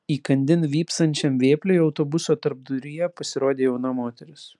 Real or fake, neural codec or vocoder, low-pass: real; none; 10.8 kHz